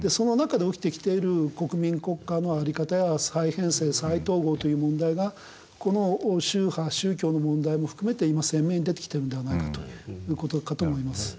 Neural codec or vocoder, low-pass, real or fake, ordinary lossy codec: none; none; real; none